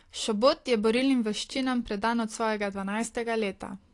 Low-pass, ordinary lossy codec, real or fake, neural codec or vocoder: 10.8 kHz; AAC, 48 kbps; real; none